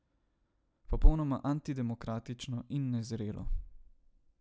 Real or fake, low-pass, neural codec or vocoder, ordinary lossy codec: real; none; none; none